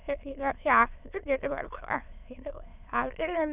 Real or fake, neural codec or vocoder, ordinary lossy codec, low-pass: fake; autoencoder, 22.05 kHz, a latent of 192 numbers a frame, VITS, trained on many speakers; Opus, 64 kbps; 3.6 kHz